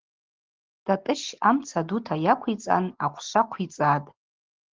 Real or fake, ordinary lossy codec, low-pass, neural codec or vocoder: real; Opus, 16 kbps; 7.2 kHz; none